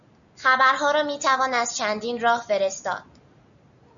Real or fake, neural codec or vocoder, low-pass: real; none; 7.2 kHz